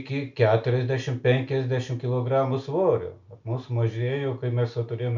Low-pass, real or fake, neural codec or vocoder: 7.2 kHz; real; none